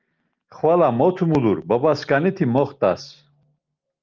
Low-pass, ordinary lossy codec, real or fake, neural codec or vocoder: 7.2 kHz; Opus, 32 kbps; real; none